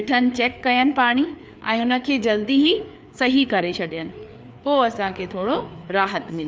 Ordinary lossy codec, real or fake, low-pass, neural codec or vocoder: none; fake; none; codec, 16 kHz, 4 kbps, FreqCodec, larger model